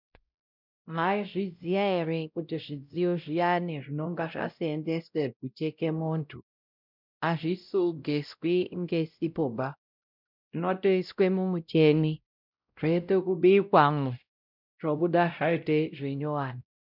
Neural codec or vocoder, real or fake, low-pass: codec, 16 kHz, 0.5 kbps, X-Codec, WavLM features, trained on Multilingual LibriSpeech; fake; 5.4 kHz